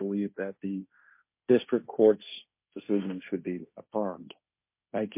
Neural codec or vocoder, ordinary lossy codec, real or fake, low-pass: codec, 16 kHz, 1.1 kbps, Voila-Tokenizer; MP3, 24 kbps; fake; 3.6 kHz